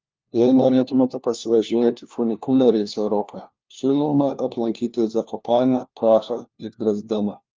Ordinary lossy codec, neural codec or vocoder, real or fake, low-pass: Opus, 24 kbps; codec, 16 kHz, 1 kbps, FunCodec, trained on LibriTTS, 50 frames a second; fake; 7.2 kHz